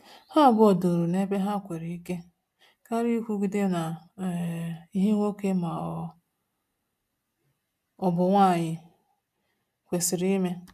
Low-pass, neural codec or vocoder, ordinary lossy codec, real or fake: 14.4 kHz; none; MP3, 96 kbps; real